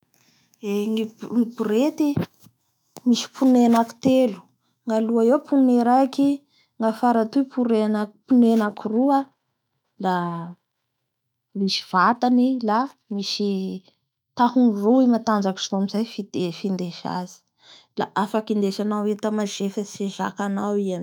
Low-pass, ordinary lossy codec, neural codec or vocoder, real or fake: 19.8 kHz; none; autoencoder, 48 kHz, 128 numbers a frame, DAC-VAE, trained on Japanese speech; fake